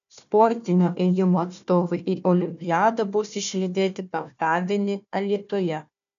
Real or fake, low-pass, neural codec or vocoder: fake; 7.2 kHz; codec, 16 kHz, 1 kbps, FunCodec, trained on Chinese and English, 50 frames a second